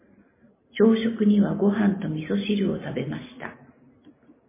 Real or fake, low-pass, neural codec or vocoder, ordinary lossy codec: real; 3.6 kHz; none; MP3, 16 kbps